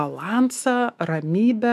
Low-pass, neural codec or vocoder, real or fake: 14.4 kHz; autoencoder, 48 kHz, 128 numbers a frame, DAC-VAE, trained on Japanese speech; fake